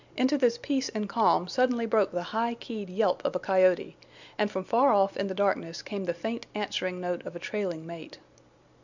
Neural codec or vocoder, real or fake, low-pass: none; real; 7.2 kHz